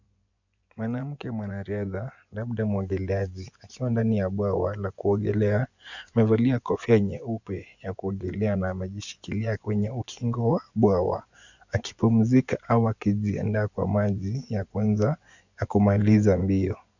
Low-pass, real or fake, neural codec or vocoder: 7.2 kHz; fake; vocoder, 24 kHz, 100 mel bands, Vocos